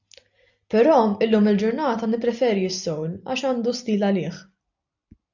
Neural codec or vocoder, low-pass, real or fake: none; 7.2 kHz; real